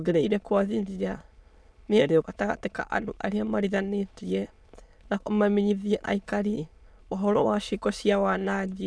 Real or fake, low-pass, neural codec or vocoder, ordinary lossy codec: fake; none; autoencoder, 22.05 kHz, a latent of 192 numbers a frame, VITS, trained on many speakers; none